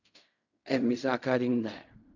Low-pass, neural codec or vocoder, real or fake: 7.2 kHz; codec, 16 kHz in and 24 kHz out, 0.4 kbps, LongCat-Audio-Codec, fine tuned four codebook decoder; fake